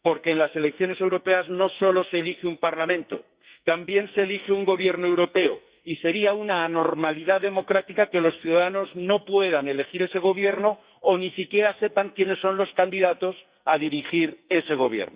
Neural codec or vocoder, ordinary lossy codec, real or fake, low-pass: codec, 44.1 kHz, 2.6 kbps, SNAC; Opus, 64 kbps; fake; 3.6 kHz